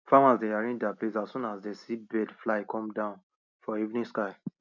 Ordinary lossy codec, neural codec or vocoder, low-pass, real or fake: none; none; 7.2 kHz; real